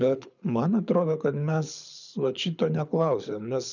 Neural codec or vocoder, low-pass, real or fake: codec, 24 kHz, 6 kbps, HILCodec; 7.2 kHz; fake